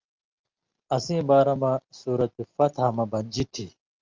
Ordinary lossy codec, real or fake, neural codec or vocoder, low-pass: Opus, 24 kbps; real; none; 7.2 kHz